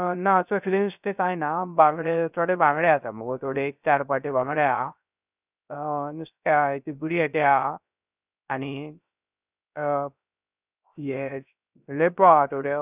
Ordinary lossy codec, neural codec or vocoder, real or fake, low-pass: none; codec, 16 kHz, 0.3 kbps, FocalCodec; fake; 3.6 kHz